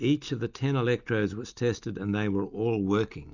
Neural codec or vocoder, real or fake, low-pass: none; real; 7.2 kHz